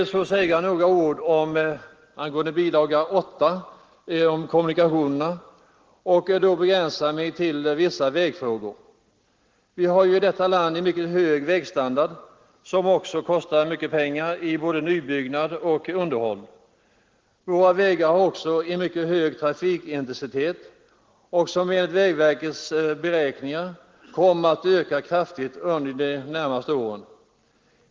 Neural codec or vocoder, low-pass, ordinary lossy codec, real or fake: none; 7.2 kHz; Opus, 16 kbps; real